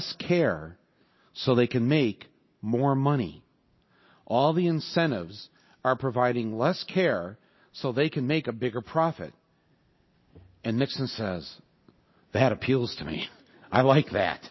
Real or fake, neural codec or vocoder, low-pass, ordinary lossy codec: real; none; 7.2 kHz; MP3, 24 kbps